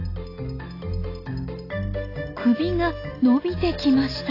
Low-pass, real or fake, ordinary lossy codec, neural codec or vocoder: 5.4 kHz; real; AAC, 24 kbps; none